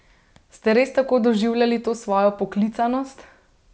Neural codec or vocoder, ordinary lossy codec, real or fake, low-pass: none; none; real; none